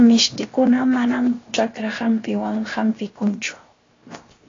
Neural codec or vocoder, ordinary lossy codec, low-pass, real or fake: codec, 16 kHz, 0.7 kbps, FocalCodec; AAC, 32 kbps; 7.2 kHz; fake